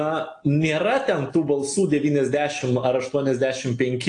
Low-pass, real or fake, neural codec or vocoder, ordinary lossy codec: 9.9 kHz; real; none; AAC, 48 kbps